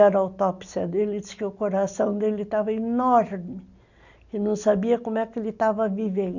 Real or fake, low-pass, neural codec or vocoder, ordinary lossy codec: real; 7.2 kHz; none; none